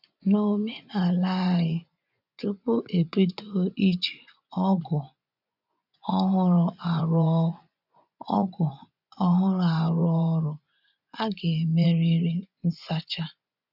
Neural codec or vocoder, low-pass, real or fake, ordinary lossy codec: vocoder, 24 kHz, 100 mel bands, Vocos; 5.4 kHz; fake; none